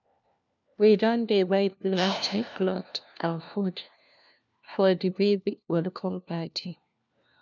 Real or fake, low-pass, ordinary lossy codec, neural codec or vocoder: fake; 7.2 kHz; none; codec, 16 kHz, 1 kbps, FunCodec, trained on LibriTTS, 50 frames a second